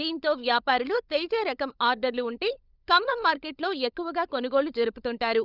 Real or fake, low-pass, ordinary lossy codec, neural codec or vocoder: fake; 5.4 kHz; Opus, 64 kbps; codec, 16 kHz, 16 kbps, FunCodec, trained on LibriTTS, 50 frames a second